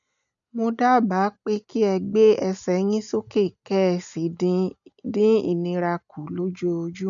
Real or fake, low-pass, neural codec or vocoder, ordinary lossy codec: real; 7.2 kHz; none; none